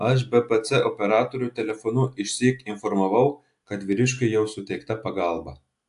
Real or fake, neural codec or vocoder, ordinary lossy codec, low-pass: real; none; AAC, 64 kbps; 10.8 kHz